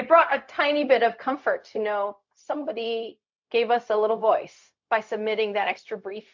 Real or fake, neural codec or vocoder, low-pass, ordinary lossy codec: fake; codec, 16 kHz, 0.4 kbps, LongCat-Audio-Codec; 7.2 kHz; MP3, 48 kbps